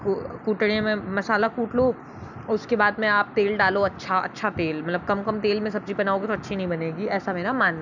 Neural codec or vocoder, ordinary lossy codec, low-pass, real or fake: none; none; 7.2 kHz; real